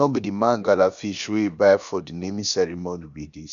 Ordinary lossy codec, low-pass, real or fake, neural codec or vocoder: none; 7.2 kHz; fake; codec, 16 kHz, about 1 kbps, DyCAST, with the encoder's durations